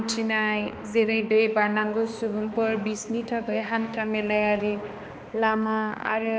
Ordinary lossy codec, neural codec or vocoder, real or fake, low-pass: none; codec, 16 kHz, 2 kbps, X-Codec, HuBERT features, trained on balanced general audio; fake; none